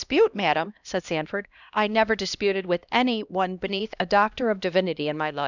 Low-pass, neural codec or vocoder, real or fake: 7.2 kHz; codec, 16 kHz, 1 kbps, X-Codec, HuBERT features, trained on LibriSpeech; fake